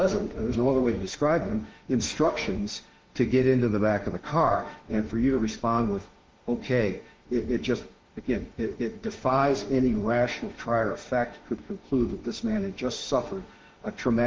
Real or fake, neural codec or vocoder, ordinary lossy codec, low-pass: fake; autoencoder, 48 kHz, 32 numbers a frame, DAC-VAE, trained on Japanese speech; Opus, 16 kbps; 7.2 kHz